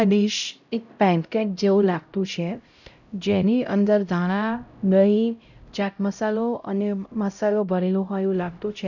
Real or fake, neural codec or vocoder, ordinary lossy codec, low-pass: fake; codec, 16 kHz, 0.5 kbps, X-Codec, HuBERT features, trained on LibriSpeech; none; 7.2 kHz